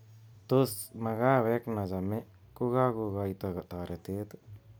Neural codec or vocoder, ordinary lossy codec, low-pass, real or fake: none; none; none; real